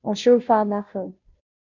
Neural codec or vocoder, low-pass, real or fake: codec, 16 kHz, 0.5 kbps, FunCodec, trained on Chinese and English, 25 frames a second; 7.2 kHz; fake